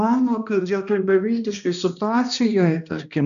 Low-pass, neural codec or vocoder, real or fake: 7.2 kHz; codec, 16 kHz, 1 kbps, X-Codec, HuBERT features, trained on balanced general audio; fake